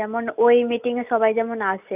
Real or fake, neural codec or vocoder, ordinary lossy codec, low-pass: real; none; none; 3.6 kHz